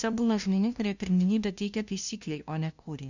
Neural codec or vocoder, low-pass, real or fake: codec, 16 kHz, 0.5 kbps, FunCodec, trained on LibriTTS, 25 frames a second; 7.2 kHz; fake